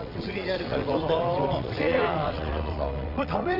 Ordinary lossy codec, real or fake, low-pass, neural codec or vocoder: none; fake; 5.4 kHz; vocoder, 22.05 kHz, 80 mel bands, WaveNeXt